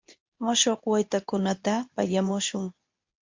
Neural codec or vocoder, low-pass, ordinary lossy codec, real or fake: codec, 24 kHz, 0.9 kbps, WavTokenizer, medium speech release version 2; 7.2 kHz; MP3, 48 kbps; fake